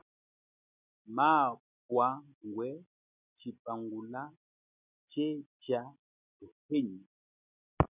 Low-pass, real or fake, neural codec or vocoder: 3.6 kHz; real; none